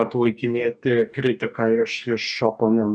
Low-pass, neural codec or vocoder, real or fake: 9.9 kHz; codec, 44.1 kHz, 2.6 kbps, DAC; fake